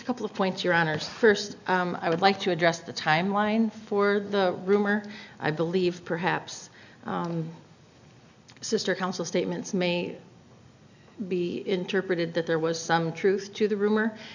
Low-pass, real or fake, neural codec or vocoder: 7.2 kHz; real; none